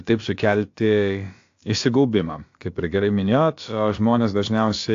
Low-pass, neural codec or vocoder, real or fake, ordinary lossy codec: 7.2 kHz; codec, 16 kHz, about 1 kbps, DyCAST, with the encoder's durations; fake; AAC, 48 kbps